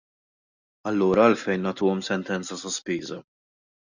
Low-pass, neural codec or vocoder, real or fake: 7.2 kHz; none; real